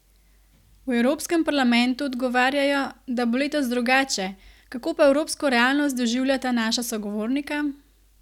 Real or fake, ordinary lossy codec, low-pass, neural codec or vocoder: real; none; 19.8 kHz; none